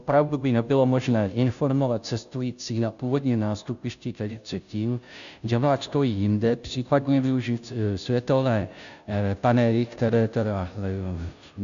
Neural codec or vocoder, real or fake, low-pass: codec, 16 kHz, 0.5 kbps, FunCodec, trained on Chinese and English, 25 frames a second; fake; 7.2 kHz